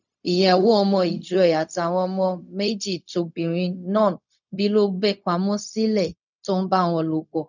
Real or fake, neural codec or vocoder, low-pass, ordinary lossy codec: fake; codec, 16 kHz, 0.4 kbps, LongCat-Audio-Codec; 7.2 kHz; none